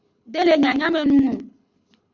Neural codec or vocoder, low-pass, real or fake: codec, 24 kHz, 6 kbps, HILCodec; 7.2 kHz; fake